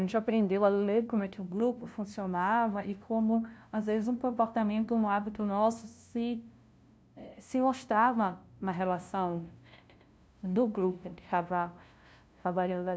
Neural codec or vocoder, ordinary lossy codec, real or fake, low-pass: codec, 16 kHz, 0.5 kbps, FunCodec, trained on LibriTTS, 25 frames a second; none; fake; none